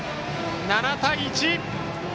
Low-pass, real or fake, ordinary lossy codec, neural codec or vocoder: none; real; none; none